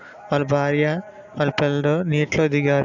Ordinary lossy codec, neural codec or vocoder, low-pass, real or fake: none; none; 7.2 kHz; real